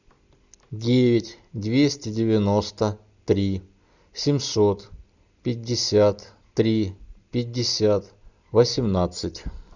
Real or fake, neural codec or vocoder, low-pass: real; none; 7.2 kHz